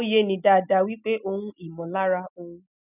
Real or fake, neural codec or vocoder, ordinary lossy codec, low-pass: real; none; none; 3.6 kHz